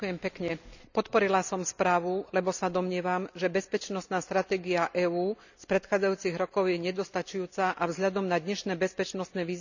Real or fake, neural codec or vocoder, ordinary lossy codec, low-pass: real; none; none; 7.2 kHz